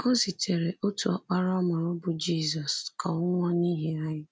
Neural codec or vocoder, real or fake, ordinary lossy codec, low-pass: none; real; none; none